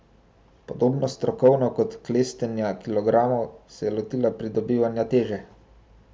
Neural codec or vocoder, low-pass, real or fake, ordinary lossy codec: none; none; real; none